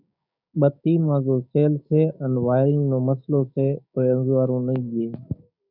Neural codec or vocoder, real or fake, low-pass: codec, 16 kHz, 6 kbps, DAC; fake; 5.4 kHz